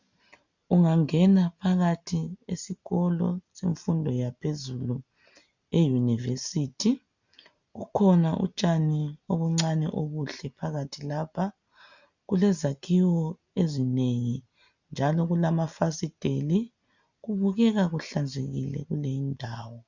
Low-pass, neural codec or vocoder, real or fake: 7.2 kHz; none; real